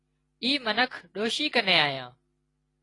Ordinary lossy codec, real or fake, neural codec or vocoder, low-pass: AAC, 32 kbps; real; none; 10.8 kHz